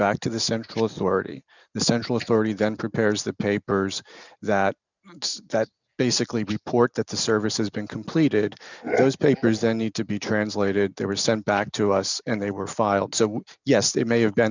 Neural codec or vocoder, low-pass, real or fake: none; 7.2 kHz; real